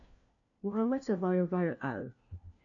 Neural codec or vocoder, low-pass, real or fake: codec, 16 kHz, 1 kbps, FunCodec, trained on LibriTTS, 50 frames a second; 7.2 kHz; fake